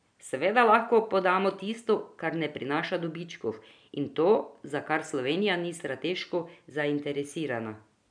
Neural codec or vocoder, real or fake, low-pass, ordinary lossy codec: none; real; 9.9 kHz; none